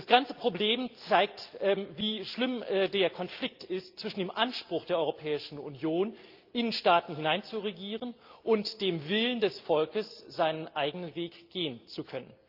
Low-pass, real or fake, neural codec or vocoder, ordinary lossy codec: 5.4 kHz; real; none; Opus, 24 kbps